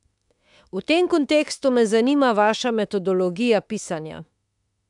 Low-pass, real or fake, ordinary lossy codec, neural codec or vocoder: 10.8 kHz; fake; MP3, 96 kbps; autoencoder, 48 kHz, 32 numbers a frame, DAC-VAE, trained on Japanese speech